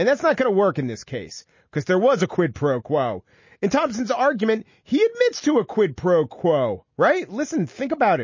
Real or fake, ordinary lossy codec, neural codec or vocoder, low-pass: real; MP3, 32 kbps; none; 7.2 kHz